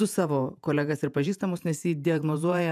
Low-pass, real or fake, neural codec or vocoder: 14.4 kHz; fake; vocoder, 48 kHz, 128 mel bands, Vocos